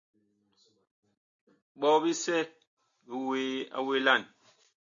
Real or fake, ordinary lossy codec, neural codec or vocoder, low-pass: real; AAC, 64 kbps; none; 7.2 kHz